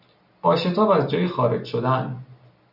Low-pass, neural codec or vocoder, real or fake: 5.4 kHz; none; real